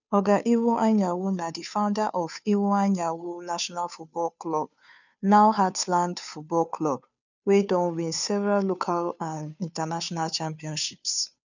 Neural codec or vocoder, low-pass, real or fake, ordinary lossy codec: codec, 16 kHz, 2 kbps, FunCodec, trained on Chinese and English, 25 frames a second; 7.2 kHz; fake; none